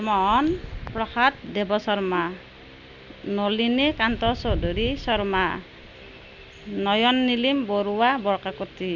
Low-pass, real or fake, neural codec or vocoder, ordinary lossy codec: 7.2 kHz; real; none; Opus, 64 kbps